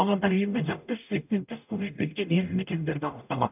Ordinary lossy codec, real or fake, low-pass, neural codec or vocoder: none; fake; 3.6 kHz; codec, 44.1 kHz, 0.9 kbps, DAC